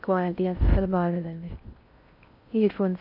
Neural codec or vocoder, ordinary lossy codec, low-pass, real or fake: codec, 16 kHz in and 24 kHz out, 0.6 kbps, FocalCodec, streaming, 4096 codes; none; 5.4 kHz; fake